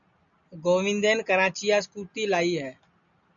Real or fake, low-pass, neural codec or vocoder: real; 7.2 kHz; none